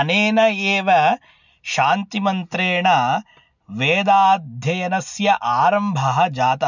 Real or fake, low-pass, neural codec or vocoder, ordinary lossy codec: real; 7.2 kHz; none; none